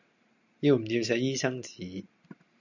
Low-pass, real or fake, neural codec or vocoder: 7.2 kHz; real; none